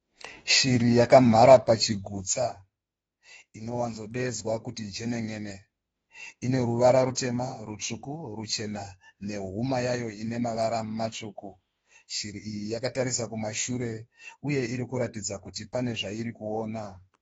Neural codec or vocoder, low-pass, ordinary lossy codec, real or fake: autoencoder, 48 kHz, 32 numbers a frame, DAC-VAE, trained on Japanese speech; 19.8 kHz; AAC, 24 kbps; fake